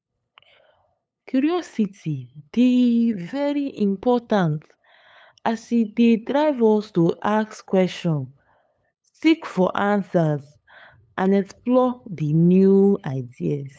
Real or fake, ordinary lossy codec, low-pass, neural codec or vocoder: fake; none; none; codec, 16 kHz, 8 kbps, FunCodec, trained on LibriTTS, 25 frames a second